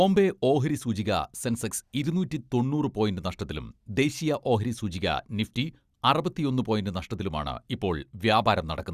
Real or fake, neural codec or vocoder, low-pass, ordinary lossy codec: real; none; 14.4 kHz; Opus, 64 kbps